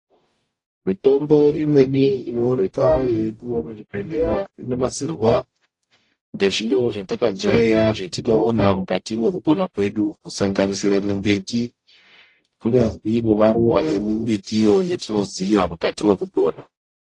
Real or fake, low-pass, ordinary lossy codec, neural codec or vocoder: fake; 10.8 kHz; AAC, 48 kbps; codec, 44.1 kHz, 0.9 kbps, DAC